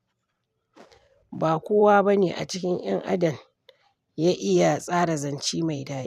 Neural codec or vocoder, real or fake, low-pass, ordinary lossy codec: vocoder, 44.1 kHz, 128 mel bands every 512 samples, BigVGAN v2; fake; 14.4 kHz; none